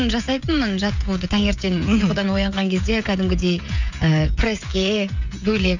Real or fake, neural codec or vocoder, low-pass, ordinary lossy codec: fake; codec, 16 kHz, 16 kbps, FreqCodec, smaller model; 7.2 kHz; none